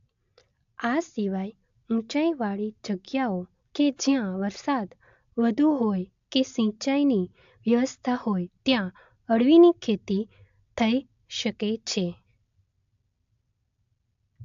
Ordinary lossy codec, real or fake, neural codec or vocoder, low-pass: AAC, 64 kbps; real; none; 7.2 kHz